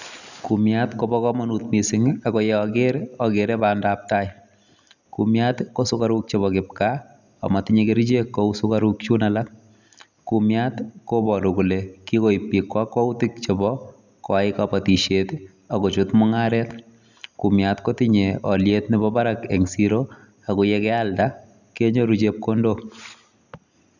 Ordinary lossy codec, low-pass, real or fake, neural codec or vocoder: none; 7.2 kHz; real; none